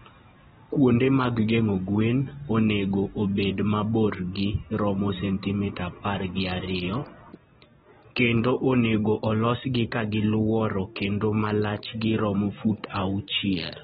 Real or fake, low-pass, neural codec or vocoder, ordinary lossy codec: real; 9.9 kHz; none; AAC, 16 kbps